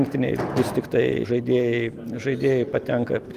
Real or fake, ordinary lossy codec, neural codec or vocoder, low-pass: real; Opus, 24 kbps; none; 14.4 kHz